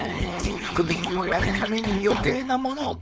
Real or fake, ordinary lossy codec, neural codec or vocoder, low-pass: fake; none; codec, 16 kHz, 4.8 kbps, FACodec; none